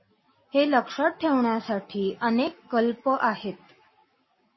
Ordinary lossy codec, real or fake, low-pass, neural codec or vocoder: MP3, 24 kbps; real; 7.2 kHz; none